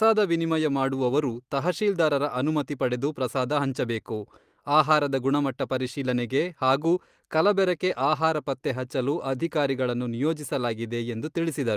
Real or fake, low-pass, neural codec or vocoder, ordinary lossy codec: real; 14.4 kHz; none; Opus, 32 kbps